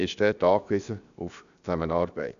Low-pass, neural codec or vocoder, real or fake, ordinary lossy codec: 7.2 kHz; codec, 16 kHz, 0.7 kbps, FocalCodec; fake; none